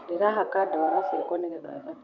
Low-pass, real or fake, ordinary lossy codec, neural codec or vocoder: 7.2 kHz; fake; none; vocoder, 44.1 kHz, 128 mel bands, Pupu-Vocoder